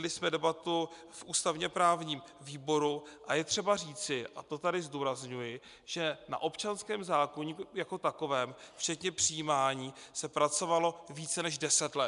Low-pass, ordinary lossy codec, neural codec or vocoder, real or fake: 10.8 kHz; MP3, 96 kbps; none; real